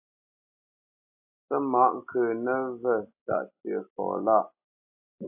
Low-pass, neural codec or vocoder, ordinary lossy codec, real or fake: 3.6 kHz; none; AAC, 32 kbps; real